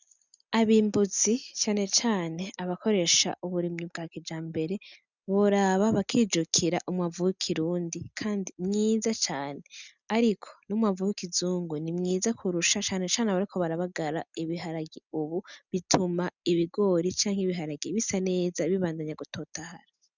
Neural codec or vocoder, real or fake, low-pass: none; real; 7.2 kHz